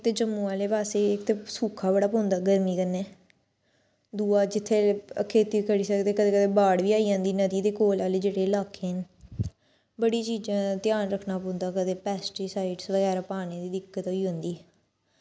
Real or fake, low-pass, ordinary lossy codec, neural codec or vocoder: real; none; none; none